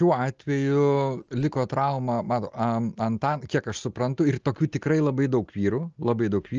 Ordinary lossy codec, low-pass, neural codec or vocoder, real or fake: Opus, 24 kbps; 7.2 kHz; none; real